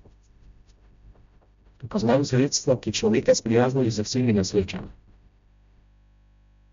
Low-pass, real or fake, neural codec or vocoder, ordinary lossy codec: 7.2 kHz; fake; codec, 16 kHz, 0.5 kbps, FreqCodec, smaller model; MP3, 64 kbps